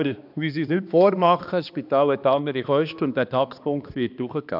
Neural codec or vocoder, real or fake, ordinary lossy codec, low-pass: codec, 16 kHz, 2 kbps, X-Codec, HuBERT features, trained on balanced general audio; fake; AAC, 48 kbps; 5.4 kHz